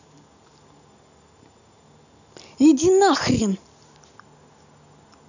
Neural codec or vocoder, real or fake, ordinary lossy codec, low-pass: none; real; none; 7.2 kHz